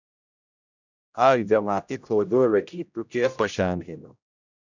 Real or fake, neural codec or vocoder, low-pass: fake; codec, 16 kHz, 0.5 kbps, X-Codec, HuBERT features, trained on general audio; 7.2 kHz